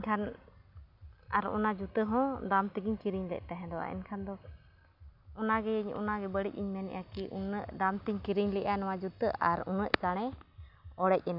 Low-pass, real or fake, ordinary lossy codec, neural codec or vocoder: 5.4 kHz; real; none; none